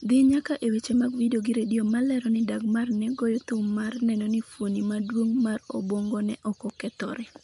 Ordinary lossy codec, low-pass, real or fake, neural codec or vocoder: MP3, 64 kbps; 10.8 kHz; real; none